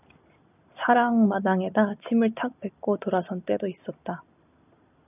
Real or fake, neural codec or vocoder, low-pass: real; none; 3.6 kHz